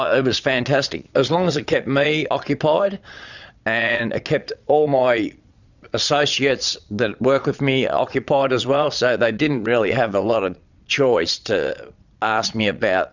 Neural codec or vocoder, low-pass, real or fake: vocoder, 22.05 kHz, 80 mel bands, Vocos; 7.2 kHz; fake